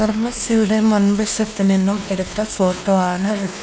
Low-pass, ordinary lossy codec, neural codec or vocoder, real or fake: none; none; codec, 16 kHz, 2 kbps, X-Codec, WavLM features, trained on Multilingual LibriSpeech; fake